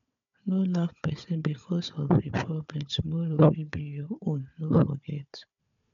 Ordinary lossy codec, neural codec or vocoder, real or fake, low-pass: none; codec, 16 kHz, 4 kbps, FunCodec, trained on Chinese and English, 50 frames a second; fake; 7.2 kHz